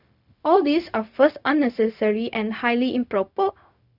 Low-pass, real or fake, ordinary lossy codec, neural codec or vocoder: 5.4 kHz; fake; none; codec, 16 kHz, 0.4 kbps, LongCat-Audio-Codec